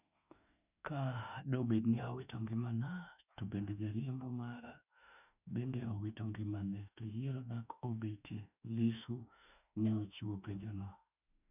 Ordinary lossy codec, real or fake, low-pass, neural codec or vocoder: none; fake; 3.6 kHz; autoencoder, 48 kHz, 32 numbers a frame, DAC-VAE, trained on Japanese speech